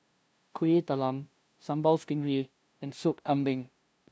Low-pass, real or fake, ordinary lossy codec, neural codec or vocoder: none; fake; none; codec, 16 kHz, 0.5 kbps, FunCodec, trained on LibriTTS, 25 frames a second